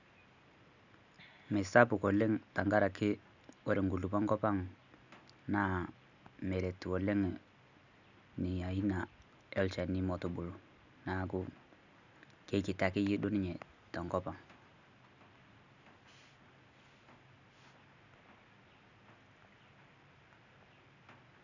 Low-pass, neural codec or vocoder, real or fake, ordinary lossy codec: 7.2 kHz; none; real; none